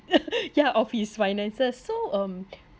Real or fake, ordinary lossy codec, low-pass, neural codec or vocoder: real; none; none; none